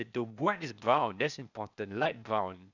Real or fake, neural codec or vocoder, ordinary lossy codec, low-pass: fake; codec, 16 kHz, 0.8 kbps, ZipCodec; none; 7.2 kHz